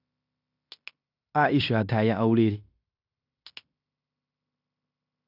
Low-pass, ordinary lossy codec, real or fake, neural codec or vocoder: 5.4 kHz; none; fake; codec, 16 kHz in and 24 kHz out, 0.9 kbps, LongCat-Audio-Codec, fine tuned four codebook decoder